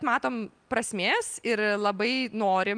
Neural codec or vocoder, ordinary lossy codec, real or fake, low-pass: none; Opus, 32 kbps; real; 9.9 kHz